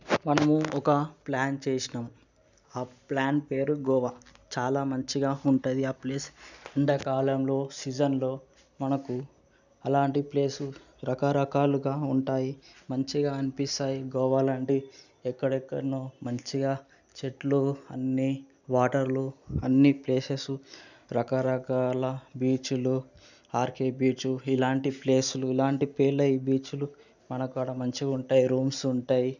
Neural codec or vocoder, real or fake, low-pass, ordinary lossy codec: none; real; 7.2 kHz; none